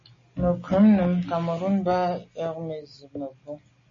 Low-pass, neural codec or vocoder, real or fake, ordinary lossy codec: 7.2 kHz; none; real; MP3, 32 kbps